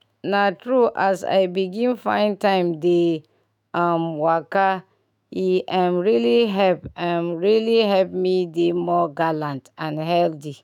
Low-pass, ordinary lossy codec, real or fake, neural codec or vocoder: 19.8 kHz; none; fake; autoencoder, 48 kHz, 128 numbers a frame, DAC-VAE, trained on Japanese speech